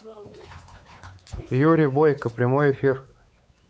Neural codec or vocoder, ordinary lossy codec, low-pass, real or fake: codec, 16 kHz, 4 kbps, X-Codec, WavLM features, trained on Multilingual LibriSpeech; none; none; fake